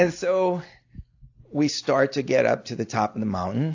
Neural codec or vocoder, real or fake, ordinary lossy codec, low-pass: none; real; AAC, 48 kbps; 7.2 kHz